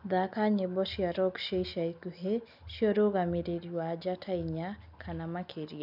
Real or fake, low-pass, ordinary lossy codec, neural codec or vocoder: real; 5.4 kHz; none; none